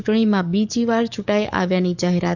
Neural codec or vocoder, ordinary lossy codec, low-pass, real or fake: codec, 44.1 kHz, 7.8 kbps, DAC; none; 7.2 kHz; fake